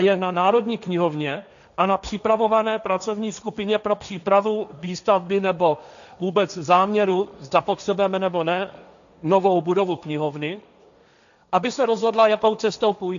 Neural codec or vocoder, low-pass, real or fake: codec, 16 kHz, 1.1 kbps, Voila-Tokenizer; 7.2 kHz; fake